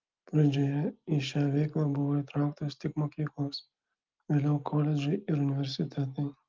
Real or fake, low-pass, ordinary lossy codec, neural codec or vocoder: real; 7.2 kHz; Opus, 32 kbps; none